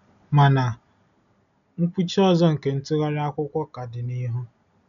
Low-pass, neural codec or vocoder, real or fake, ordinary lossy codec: 7.2 kHz; none; real; none